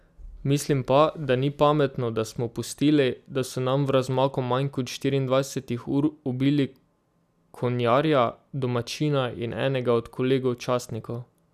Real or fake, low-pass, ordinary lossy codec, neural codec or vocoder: real; 14.4 kHz; none; none